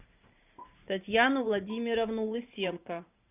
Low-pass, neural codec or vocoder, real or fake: 3.6 kHz; vocoder, 44.1 kHz, 128 mel bands every 512 samples, BigVGAN v2; fake